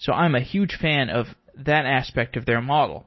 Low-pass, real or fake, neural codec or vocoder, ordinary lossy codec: 7.2 kHz; real; none; MP3, 24 kbps